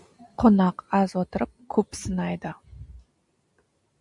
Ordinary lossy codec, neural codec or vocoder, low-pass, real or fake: MP3, 64 kbps; none; 10.8 kHz; real